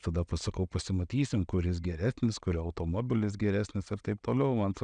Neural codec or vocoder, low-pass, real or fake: none; 9.9 kHz; real